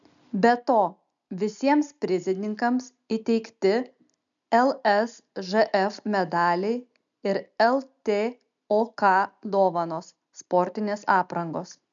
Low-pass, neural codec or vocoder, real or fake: 7.2 kHz; none; real